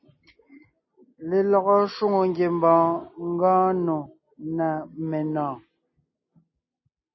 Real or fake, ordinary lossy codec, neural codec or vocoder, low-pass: real; MP3, 24 kbps; none; 7.2 kHz